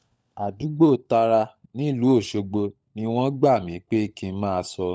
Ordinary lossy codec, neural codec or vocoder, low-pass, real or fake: none; codec, 16 kHz, 16 kbps, FunCodec, trained on LibriTTS, 50 frames a second; none; fake